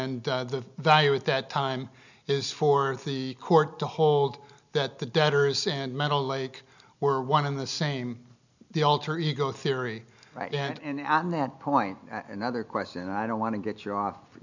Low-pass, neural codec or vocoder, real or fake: 7.2 kHz; none; real